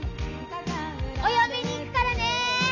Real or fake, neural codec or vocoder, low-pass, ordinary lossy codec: real; none; 7.2 kHz; none